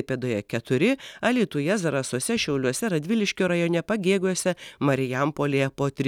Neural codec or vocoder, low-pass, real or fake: none; 19.8 kHz; real